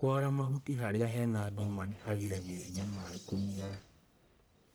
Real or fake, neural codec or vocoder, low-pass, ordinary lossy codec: fake; codec, 44.1 kHz, 1.7 kbps, Pupu-Codec; none; none